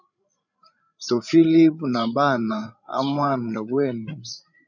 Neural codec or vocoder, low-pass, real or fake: codec, 16 kHz, 8 kbps, FreqCodec, larger model; 7.2 kHz; fake